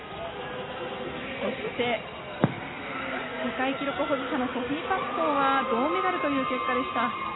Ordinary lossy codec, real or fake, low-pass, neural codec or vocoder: AAC, 16 kbps; real; 7.2 kHz; none